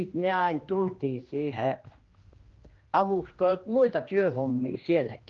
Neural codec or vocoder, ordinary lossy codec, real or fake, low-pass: codec, 16 kHz, 1 kbps, X-Codec, HuBERT features, trained on general audio; Opus, 24 kbps; fake; 7.2 kHz